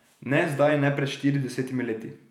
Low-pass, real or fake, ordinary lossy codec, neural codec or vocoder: 19.8 kHz; fake; none; vocoder, 48 kHz, 128 mel bands, Vocos